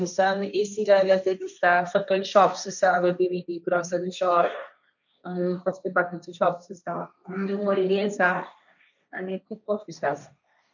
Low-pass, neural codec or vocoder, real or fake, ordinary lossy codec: 7.2 kHz; codec, 16 kHz, 1.1 kbps, Voila-Tokenizer; fake; none